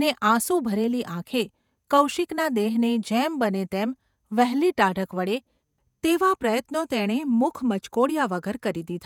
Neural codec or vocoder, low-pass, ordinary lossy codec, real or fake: vocoder, 48 kHz, 128 mel bands, Vocos; 19.8 kHz; none; fake